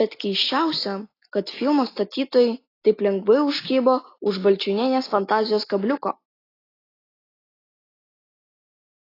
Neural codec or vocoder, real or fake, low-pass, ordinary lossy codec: none; real; 5.4 kHz; AAC, 24 kbps